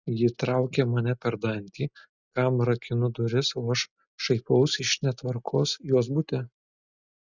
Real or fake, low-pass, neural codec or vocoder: real; 7.2 kHz; none